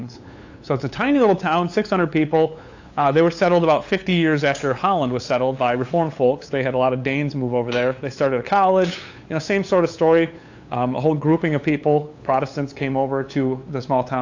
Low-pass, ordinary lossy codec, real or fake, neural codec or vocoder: 7.2 kHz; AAC, 48 kbps; fake; codec, 16 kHz, 8 kbps, FunCodec, trained on LibriTTS, 25 frames a second